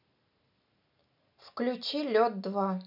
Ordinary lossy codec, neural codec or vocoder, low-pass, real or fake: none; none; 5.4 kHz; real